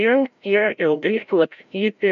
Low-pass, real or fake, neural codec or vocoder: 7.2 kHz; fake; codec, 16 kHz, 0.5 kbps, FreqCodec, larger model